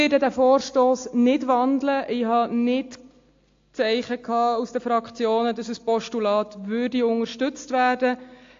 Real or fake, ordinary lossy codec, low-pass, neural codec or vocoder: real; AAC, 48 kbps; 7.2 kHz; none